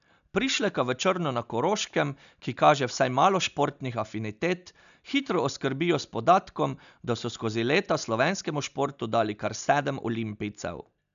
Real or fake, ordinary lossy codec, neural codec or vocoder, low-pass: real; none; none; 7.2 kHz